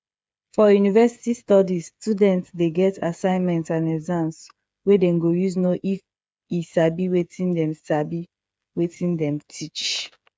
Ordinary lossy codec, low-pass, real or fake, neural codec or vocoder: none; none; fake; codec, 16 kHz, 8 kbps, FreqCodec, smaller model